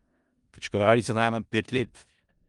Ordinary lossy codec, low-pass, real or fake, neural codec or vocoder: Opus, 32 kbps; 10.8 kHz; fake; codec, 16 kHz in and 24 kHz out, 0.4 kbps, LongCat-Audio-Codec, four codebook decoder